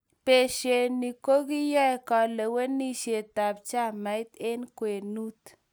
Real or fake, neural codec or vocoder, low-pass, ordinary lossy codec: real; none; none; none